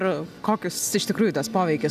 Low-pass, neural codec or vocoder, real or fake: 14.4 kHz; none; real